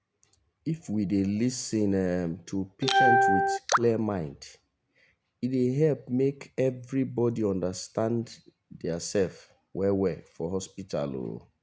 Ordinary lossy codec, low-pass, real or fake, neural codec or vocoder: none; none; real; none